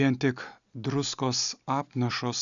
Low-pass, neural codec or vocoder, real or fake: 7.2 kHz; none; real